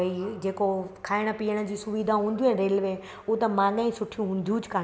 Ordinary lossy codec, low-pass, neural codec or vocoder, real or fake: none; none; none; real